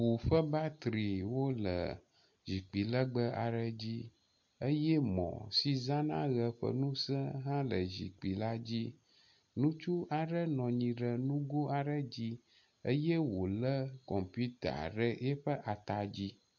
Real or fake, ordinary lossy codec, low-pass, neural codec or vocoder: real; MP3, 48 kbps; 7.2 kHz; none